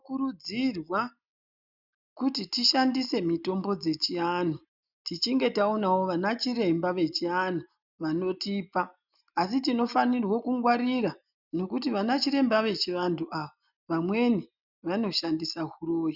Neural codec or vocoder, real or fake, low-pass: none; real; 5.4 kHz